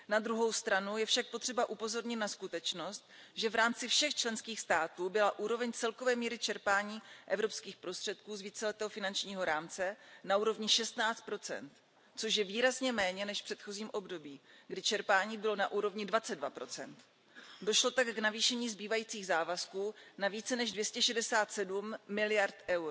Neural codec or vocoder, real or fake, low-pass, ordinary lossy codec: none; real; none; none